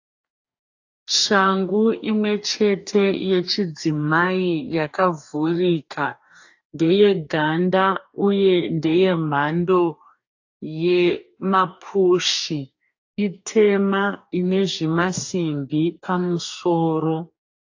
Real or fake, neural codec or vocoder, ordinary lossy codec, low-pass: fake; codec, 44.1 kHz, 2.6 kbps, DAC; AAC, 48 kbps; 7.2 kHz